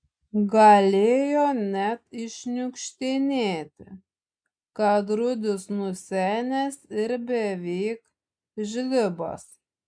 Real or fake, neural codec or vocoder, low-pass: real; none; 9.9 kHz